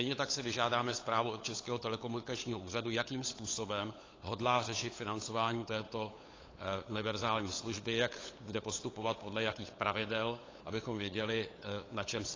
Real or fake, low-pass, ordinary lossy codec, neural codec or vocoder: fake; 7.2 kHz; AAC, 32 kbps; codec, 16 kHz, 8 kbps, FunCodec, trained on LibriTTS, 25 frames a second